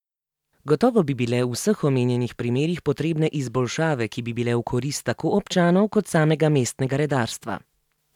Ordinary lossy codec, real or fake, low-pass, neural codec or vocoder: none; real; 19.8 kHz; none